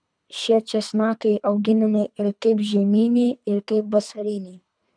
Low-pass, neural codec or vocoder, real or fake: 9.9 kHz; codec, 24 kHz, 3 kbps, HILCodec; fake